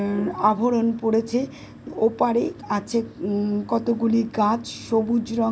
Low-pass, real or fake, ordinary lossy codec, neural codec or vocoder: none; real; none; none